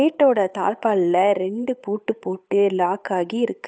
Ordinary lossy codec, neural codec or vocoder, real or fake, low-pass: none; codec, 16 kHz, 8 kbps, FunCodec, trained on Chinese and English, 25 frames a second; fake; none